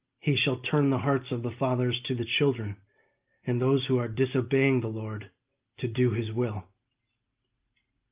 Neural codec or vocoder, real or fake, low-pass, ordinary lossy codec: none; real; 3.6 kHz; Opus, 24 kbps